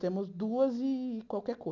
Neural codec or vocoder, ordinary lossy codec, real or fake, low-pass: none; none; real; 7.2 kHz